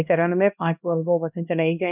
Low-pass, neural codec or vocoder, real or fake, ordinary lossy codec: 3.6 kHz; codec, 16 kHz, 1 kbps, X-Codec, HuBERT features, trained on LibriSpeech; fake; none